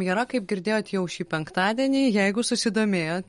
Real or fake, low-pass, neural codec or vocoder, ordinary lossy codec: real; 19.8 kHz; none; MP3, 48 kbps